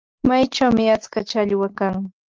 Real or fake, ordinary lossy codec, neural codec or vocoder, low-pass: real; Opus, 32 kbps; none; 7.2 kHz